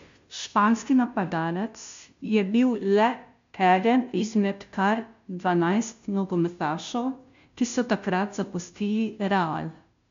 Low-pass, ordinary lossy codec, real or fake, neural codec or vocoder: 7.2 kHz; MP3, 64 kbps; fake; codec, 16 kHz, 0.5 kbps, FunCodec, trained on Chinese and English, 25 frames a second